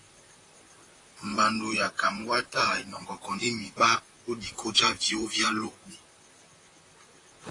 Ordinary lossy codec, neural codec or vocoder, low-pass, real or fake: AAC, 32 kbps; vocoder, 44.1 kHz, 128 mel bands, Pupu-Vocoder; 10.8 kHz; fake